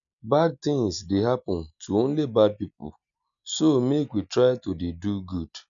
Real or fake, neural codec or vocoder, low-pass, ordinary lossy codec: real; none; 7.2 kHz; none